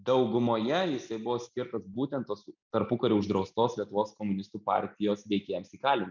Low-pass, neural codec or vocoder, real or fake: 7.2 kHz; none; real